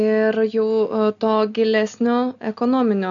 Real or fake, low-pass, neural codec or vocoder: real; 7.2 kHz; none